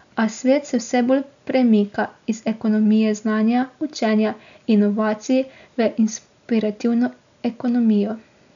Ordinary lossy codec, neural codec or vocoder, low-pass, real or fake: none; none; 7.2 kHz; real